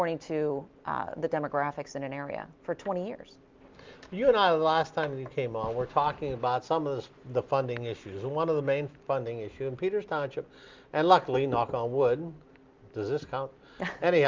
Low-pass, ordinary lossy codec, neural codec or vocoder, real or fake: 7.2 kHz; Opus, 32 kbps; none; real